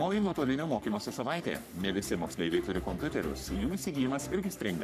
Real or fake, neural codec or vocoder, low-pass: fake; codec, 44.1 kHz, 3.4 kbps, Pupu-Codec; 14.4 kHz